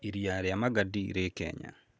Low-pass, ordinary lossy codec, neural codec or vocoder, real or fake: none; none; none; real